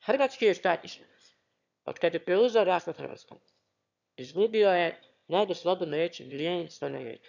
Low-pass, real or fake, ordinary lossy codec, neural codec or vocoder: 7.2 kHz; fake; none; autoencoder, 22.05 kHz, a latent of 192 numbers a frame, VITS, trained on one speaker